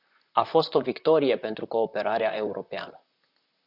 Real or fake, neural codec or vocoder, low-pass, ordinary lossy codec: fake; vocoder, 44.1 kHz, 128 mel bands, Pupu-Vocoder; 5.4 kHz; Opus, 64 kbps